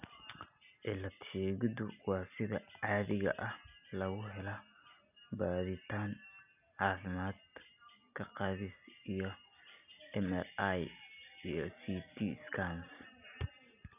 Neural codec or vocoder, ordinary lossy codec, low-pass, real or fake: none; none; 3.6 kHz; real